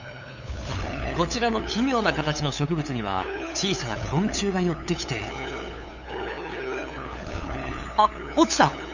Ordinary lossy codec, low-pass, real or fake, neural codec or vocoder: none; 7.2 kHz; fake; codec, 16 kHz, 8 kbps, FunCodec, trained on LibriTTS, 25 frames a second